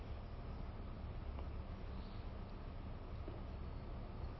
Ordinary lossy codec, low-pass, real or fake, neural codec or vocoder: MP3, 24 kbps; 7.2 kHz; real; none